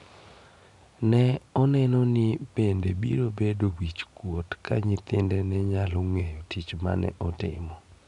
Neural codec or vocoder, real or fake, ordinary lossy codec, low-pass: none; real; none; 10.8 kHz